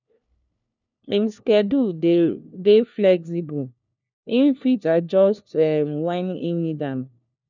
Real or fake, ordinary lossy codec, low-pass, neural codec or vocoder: fake; none; 7.2 kHz; codec, 16 kHz, 1 kbps, FunCodec, trained on LibriTTS, 50 frames a second